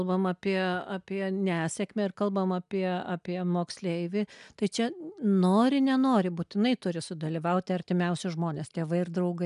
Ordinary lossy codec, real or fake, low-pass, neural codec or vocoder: MP3, 96 kbps; real; 10.8 kHz; none